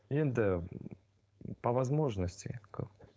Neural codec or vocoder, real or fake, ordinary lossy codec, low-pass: codec, 16 kHz, 4.8 kbps, FACodec; fake; none; none